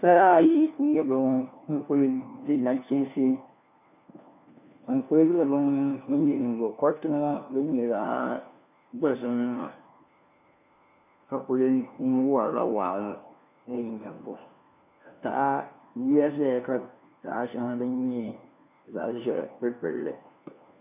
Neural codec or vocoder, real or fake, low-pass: codec, 16 kHz, 1 kbps, FunCodec, trained on LibriTTS, 50 frames a second; fake; 3.6 kHz